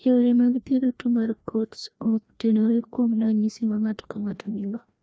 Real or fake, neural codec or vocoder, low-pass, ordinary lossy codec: fake; codec, 16 kHz, 1 kbps, FreqCodec, larger model; none; none